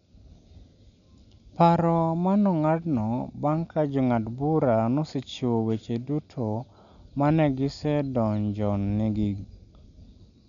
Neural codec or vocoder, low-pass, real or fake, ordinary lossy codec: none; 7.2 kHz; real; none